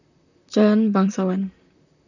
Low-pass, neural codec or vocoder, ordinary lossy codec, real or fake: 7.2 kHz; vocoder, 44.1 kHz, 128 mel bands, Pupu-Vocoder; none; fake